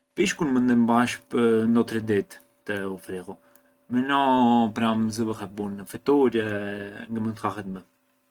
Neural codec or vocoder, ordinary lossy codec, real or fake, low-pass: none; Opus, 24 kbps; real; 19.8 kHz